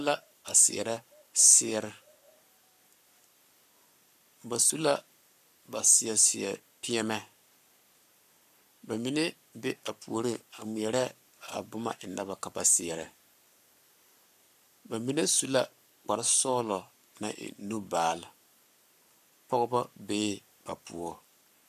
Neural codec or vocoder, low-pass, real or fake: codec, 44.1 kHz, 7.8 kbps, Pupu-Codec; 14.4 kHz; fake